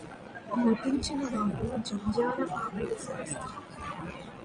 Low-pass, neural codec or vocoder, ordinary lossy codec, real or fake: 9.9 kHz; vocoder, 22.05 kHz, 80 mel bands, Vocos; Opus, 64 kbps; fake